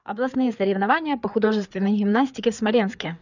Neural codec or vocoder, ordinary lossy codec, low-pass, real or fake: codec, 16 kHz, 4 kbps, FreqCodec, larger model; none; 7.2 kHz; fake